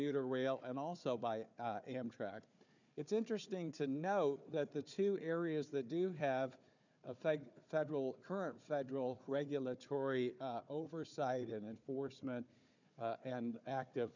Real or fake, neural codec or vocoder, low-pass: fake; codec, 16 kHz, 4 kbps, FunCodec, trained on Chinese and English, 50 frames a second; 7.2 kHz